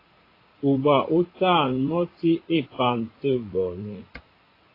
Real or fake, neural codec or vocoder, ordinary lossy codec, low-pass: fake; vocoder, 44.1 kHz, 80 mel bands, Vocos; AAC, 24 kbps; 5.4 kHz